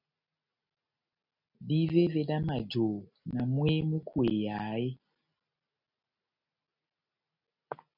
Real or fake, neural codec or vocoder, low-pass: real; none; 5.4 kHz